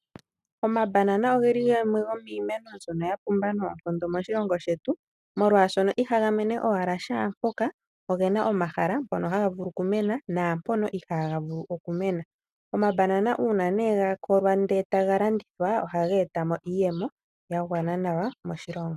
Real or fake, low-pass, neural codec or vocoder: real; 14.4 kHz; none